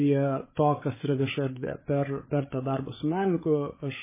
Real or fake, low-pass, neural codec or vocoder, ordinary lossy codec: fake; 3.6 kHz; codec, 16 kHz, 8 kbps, FreqCodec, larger model; MP3, 16 kbps